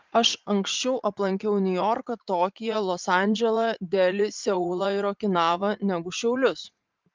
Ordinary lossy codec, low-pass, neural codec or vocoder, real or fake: Opus, 32 kbps; 7.2 kHz; vocoder, 44.1 kHz, 80 mel bands, Vocos; fake